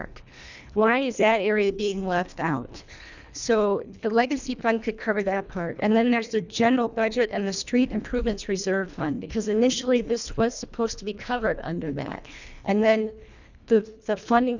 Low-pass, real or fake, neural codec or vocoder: 7.2 kHz; fake; codec, 24 kHz, 1.5 kbps, HILCodec